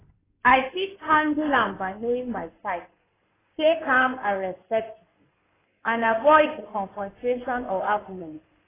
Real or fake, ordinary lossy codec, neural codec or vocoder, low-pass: fake; AAC, 16 kbps; codec, 16 kHz in and 24 kHz out, 2.2 kbps, FireRedTTS-2 codec; 3.6 kHz